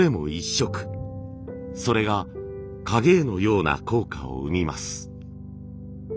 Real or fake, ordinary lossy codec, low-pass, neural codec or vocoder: real; none; none; none